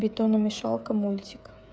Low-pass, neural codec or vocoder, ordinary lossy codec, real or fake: none; codec, 16 kHz, 16 kbps, FreqCodec, smaller model; none; fake